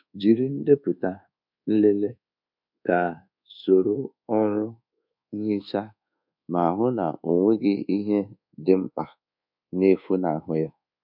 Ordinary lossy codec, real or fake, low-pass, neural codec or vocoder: none; fake; 5.4 kHz; codec, 24 kHz, 1.2 kbps, DualCodec